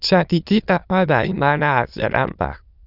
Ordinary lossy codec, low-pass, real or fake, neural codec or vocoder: Opus, 64 kbps; 5.4 kHz; fake; autoencoder, 22.05 kHz, a latent of 192 numbers a frame, VITS, trained on many speakers